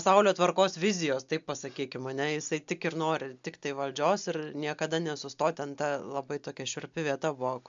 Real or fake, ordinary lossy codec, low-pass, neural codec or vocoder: real; MP3, 64 kbps; 7.2 kHz; none